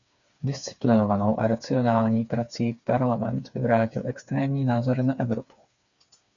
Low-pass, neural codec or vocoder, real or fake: 7.2 kHz; codec, 16 kHz, 4 kbps, FreqCodec, smaller model; fake